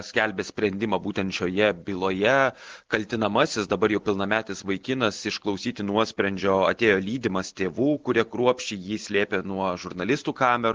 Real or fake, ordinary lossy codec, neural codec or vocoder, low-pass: real; Opus, 16 kbps; none; 7.2 kHz